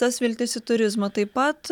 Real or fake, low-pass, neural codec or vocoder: real; 19.8 kHz; none